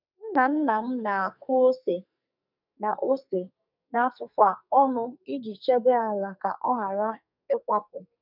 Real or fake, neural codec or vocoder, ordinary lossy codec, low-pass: fake; codec, 44.1 kHz, 2.6 kbps, SNAC; none; 5.4 kHz